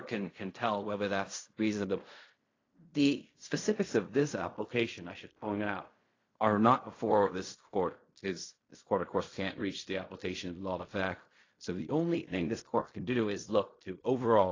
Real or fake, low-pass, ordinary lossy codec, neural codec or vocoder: fake; 7.2 kHz; AAC, 32 kbps; codec, 16 kHz in and 24 kHz out, 0.4 kbps, LongCat-Audio-Codec, fine tuned four codebook decoder